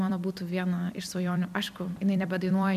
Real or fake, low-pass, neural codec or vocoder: fake; 14.4 kHz; vocoder, 44.1 kHz, 128 mel bands every 256 samples, BigVGAN v2